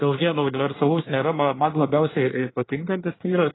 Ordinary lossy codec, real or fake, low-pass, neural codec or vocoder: AAC, 16 kbps; fake; 7.2 kHz; codec, 24 kHz, 1 kbps, SNAC